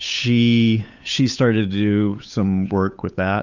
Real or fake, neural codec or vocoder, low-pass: fake; codec, 16 kHz, 8 kbps, FunCodec, trained on Chinese and English, 25 frames a second; 7.2 kHz